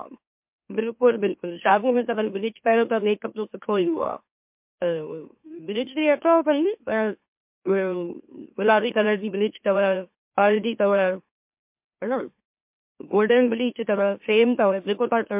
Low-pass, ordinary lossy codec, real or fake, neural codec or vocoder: 3.6 kHz; MP3, 32 kbps; fake; autoencoder, 44.1 kHz, a latent of 192 numbers a frame, MeloTTS